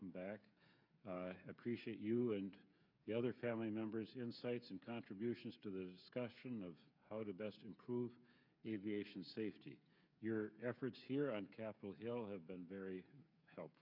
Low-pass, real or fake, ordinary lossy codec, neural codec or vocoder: 5.4 kHz; fake; MP3, 48 kbps; codec, 16 kHz, 8 kbps, FreqCodec, smaller model